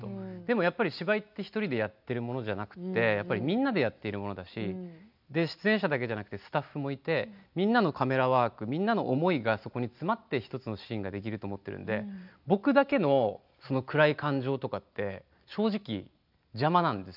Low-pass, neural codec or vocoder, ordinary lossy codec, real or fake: 5.4 kHz; none; none; real